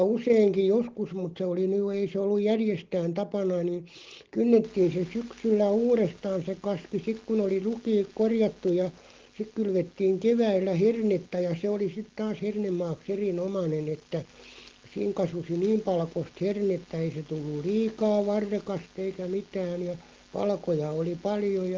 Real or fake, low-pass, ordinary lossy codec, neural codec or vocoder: real; 7.2 kHz; Opus, 16 kbps; none